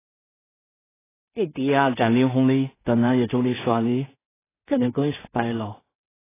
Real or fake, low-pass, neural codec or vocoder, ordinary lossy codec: fake; 3.6 kHz; codec, 16 kHz in and 24 kHz out, 0.4 kbps, LongCat-Audio-Codec, two codebook decoder; AAC, 16 kbps